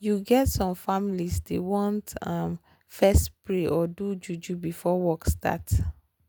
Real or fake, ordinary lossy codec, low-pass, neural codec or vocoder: real; none; none; none